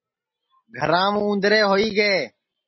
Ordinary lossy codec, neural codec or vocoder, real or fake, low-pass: MP3, 24 kbps; none; real; 7.2 kHz